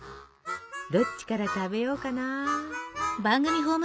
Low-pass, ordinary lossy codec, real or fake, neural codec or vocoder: none; none; real; none